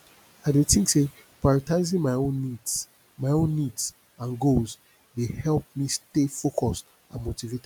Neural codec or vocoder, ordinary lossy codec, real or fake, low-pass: none; none; real; none